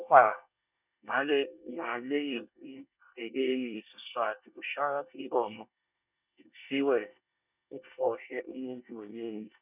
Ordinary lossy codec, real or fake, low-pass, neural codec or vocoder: none; fake; 3.6 kHz; codec, 24 kHz, 1 kbps, SNAC